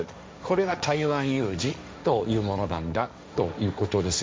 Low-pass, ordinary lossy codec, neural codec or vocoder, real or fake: none; none; codec, 16 kHz, 1.1 kbps, Voila-Tokenizer; fake